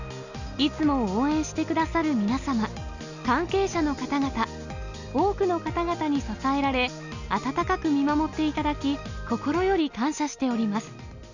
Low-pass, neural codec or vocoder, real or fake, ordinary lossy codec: 7.2 kHz; none; real; none